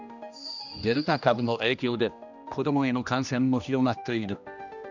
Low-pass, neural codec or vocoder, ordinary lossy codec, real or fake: 7.2 kHz; codec, 16 kHz, 1 kbps, X-Codec, HuBERT features, trained on general audio; none; fake